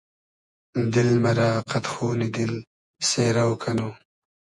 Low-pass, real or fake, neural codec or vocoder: 10.8 kHz; fake; vocoder, 48 kHz, 128 mel bands, Vocos